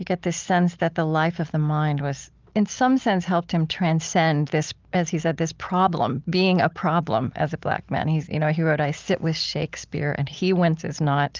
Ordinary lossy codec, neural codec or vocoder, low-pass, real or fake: Opus, 32 kbps; none; 7.2 kHz; real